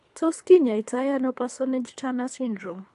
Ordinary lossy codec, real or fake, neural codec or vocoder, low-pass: AAC, 64 kbps; fake; codec, 24 kHz, 3 kbps, HILCodec; 10.8 kHz